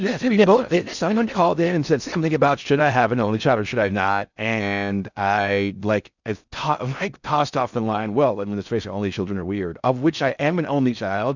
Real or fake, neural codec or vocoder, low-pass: fake; codec, 16 kHz in and 24 kHz out, 0.6 kbps, FocalCodec, streaming, 4096 codes; 7.2 kHz